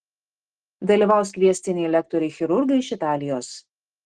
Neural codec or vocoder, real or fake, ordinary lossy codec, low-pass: none; real; Opus, 16 kbps; 10.8 kHz